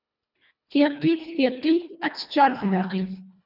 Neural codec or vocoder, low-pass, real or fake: codec, 24 kHz, 1.5 kbps, HILCodec; 5.4 kHz; fake